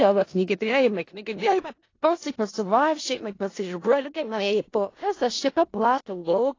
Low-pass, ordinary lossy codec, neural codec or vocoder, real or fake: 7.2 kHz; AAC, 32 kbps; codec, 16 kHz in and 24 kHz out, 0.4 kbps, LongCat-Audio-Codec, four codebook decoder; fake